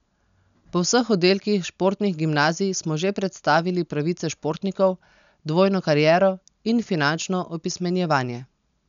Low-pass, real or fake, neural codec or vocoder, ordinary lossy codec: 7.2 kHz; real; none; none